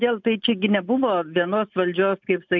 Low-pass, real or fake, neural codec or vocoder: 7.2 kHz; real; none